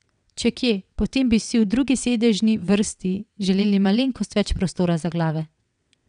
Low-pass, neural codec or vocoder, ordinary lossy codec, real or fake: 9.9 kHz; vocoder, 22.05 kHz, 80 mel bands, WaveNeXt; none; fake